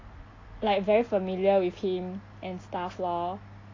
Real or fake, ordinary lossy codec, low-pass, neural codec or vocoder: real; AAC, 32 kbps; 7.2 kHz; none